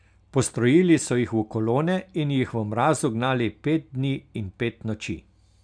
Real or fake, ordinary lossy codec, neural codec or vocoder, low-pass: real; none; none; 9.9 kHz